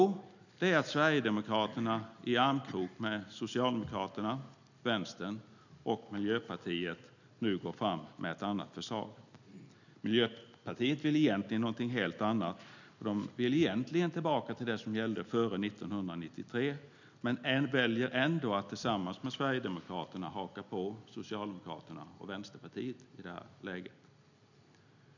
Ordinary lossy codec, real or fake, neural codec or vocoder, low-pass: none; real; none; 7.2 kHz